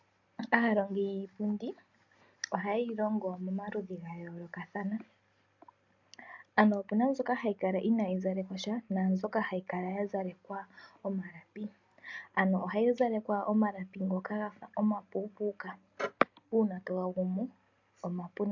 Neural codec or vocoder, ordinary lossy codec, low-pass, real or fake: none; MP3, 64 kbps; 7.2 kHz; real